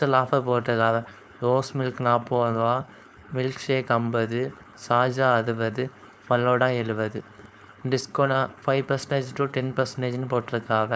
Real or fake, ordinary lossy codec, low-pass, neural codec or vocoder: fake; none; none; codec, 16 kHz, 4.8 kbps, FACodec